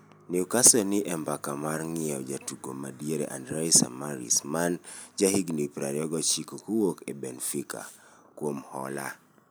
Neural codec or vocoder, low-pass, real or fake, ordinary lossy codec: none; none; real; none